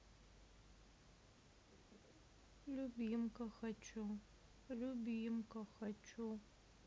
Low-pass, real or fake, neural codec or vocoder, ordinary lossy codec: none; real; none; none